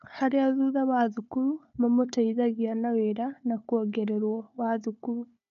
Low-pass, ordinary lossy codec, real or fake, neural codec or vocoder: 7.2 kHz; none; fake; codec, 16 kHz, 4 kbps, FunCodec, trained on Chinese and English, 50 frames a second